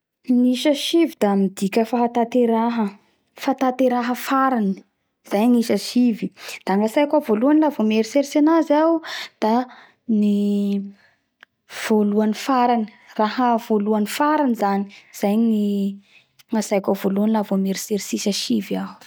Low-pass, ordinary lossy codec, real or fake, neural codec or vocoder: none; none; real; none